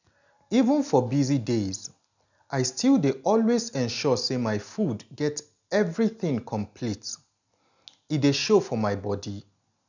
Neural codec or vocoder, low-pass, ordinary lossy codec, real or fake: none; 7.2 kHz; none; real